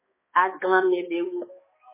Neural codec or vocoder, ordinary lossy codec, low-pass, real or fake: codec, 16 kHz, 2 kbps, X-Codec, HuBERT features, trained on balanced general audio; MP3, 16 kbps; 3.6 kHz; fake